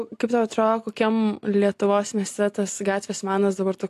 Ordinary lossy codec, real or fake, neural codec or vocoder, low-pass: AAC, 64 kbps; real; none; 14.4 kHz